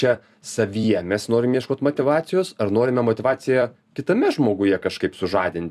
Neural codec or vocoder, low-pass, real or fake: vocoder, 44.1 kHz, 128 mel bands every 256 samples, BigVGAN v2; 14.4 kHz; fake